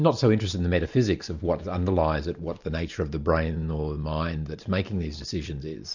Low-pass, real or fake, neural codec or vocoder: 7.2 kHz; real; none